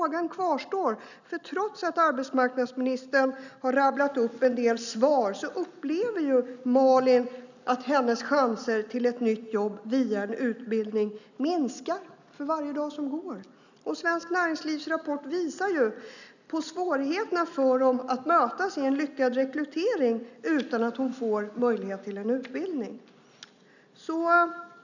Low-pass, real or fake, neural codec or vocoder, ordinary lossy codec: 7.2 kHz; real; none; none